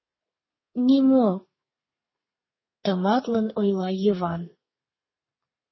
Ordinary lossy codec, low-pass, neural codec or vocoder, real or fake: MP3, 24 kbps; 7.2 kHz; codec, 32 kHz, 1.9 kbps, SNAC; fake